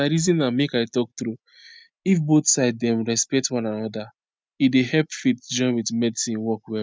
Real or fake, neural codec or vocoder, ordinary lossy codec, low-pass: real; none; none; none